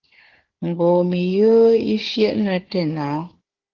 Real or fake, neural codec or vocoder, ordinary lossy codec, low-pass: fake; codec, 16 kHz, 4 kbps, FunCodec, trained on Chinese and English, 50 frames a second; Opus, 16 kbps; 7.2 kHz